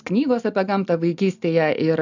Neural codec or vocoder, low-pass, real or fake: none; 7.2 kHz; real